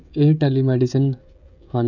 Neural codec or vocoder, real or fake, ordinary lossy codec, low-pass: codec, 16 kHz, 16 kbps, FreqCodec, smaller model; fake; none; 7.2 kHz